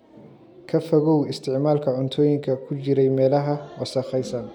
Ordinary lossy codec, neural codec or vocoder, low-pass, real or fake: none; none; 19.8 kHz; real